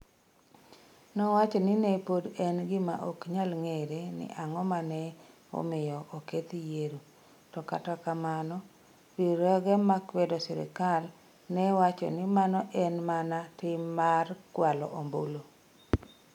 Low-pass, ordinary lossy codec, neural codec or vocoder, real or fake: 19.8 kHz; none; none; real